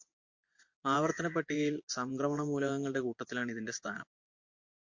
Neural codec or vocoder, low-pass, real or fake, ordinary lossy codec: vocoder, 24 kHz, 100 mel bands, Vocos; 7.2 kHz; fake; MP3, 48 kbps